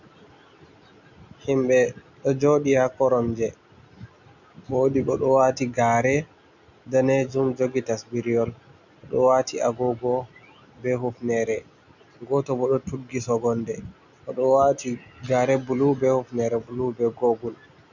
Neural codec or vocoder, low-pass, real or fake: none; 7.2 kHz; real